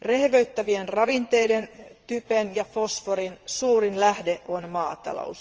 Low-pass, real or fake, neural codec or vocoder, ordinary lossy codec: 7.2 kHz; fake; vocoder, 22.05 kHz, 80 mel bands, WaveNeXt; Opus, 24 kbps